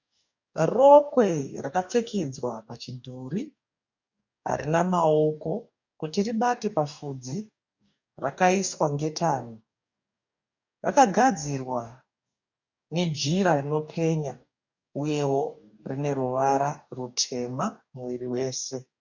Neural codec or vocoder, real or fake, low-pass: codec, 44.1 kHz, 2.6 kbps, DAC; fake; 7.2 kHz